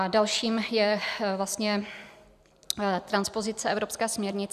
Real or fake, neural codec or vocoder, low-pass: fake; vocoder, 44.1 kHz, 128 mel bands every 256 samples, BigVGAN v2; 14.4 kHz